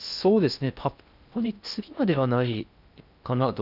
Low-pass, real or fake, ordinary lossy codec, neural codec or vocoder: 5.4 kHz; fake; none; codec, 16 kHz in and 24 kHz out, 0.6 kbps, FocalCodec, streaming, 4096 codes